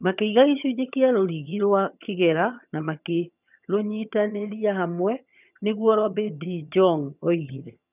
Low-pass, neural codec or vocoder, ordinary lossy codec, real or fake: 3.6 kHz; vocoder, 22.05 kHz, 80 mel bands, HiFi-GAN; none; fake